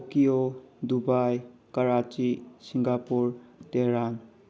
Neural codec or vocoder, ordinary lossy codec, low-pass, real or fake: none; none; none; real